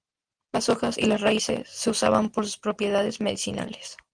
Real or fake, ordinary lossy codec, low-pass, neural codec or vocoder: real; Opus, 24 kbps; 9.9 kHz; none